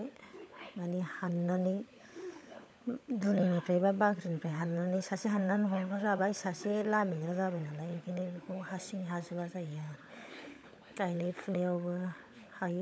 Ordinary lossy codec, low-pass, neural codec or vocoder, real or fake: none; none; codec, 16 kHz, 16 kbps, FunCodec, trained on LibriTTS, 50 frames a second; fake